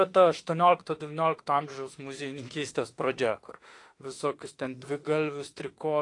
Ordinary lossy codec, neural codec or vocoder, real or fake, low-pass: AAC, 48 kbps; autoencoder, 48 kHz, 32 numbers a frame, DAC-VAE, trained on Japanese speech; fake; 10.8 kHz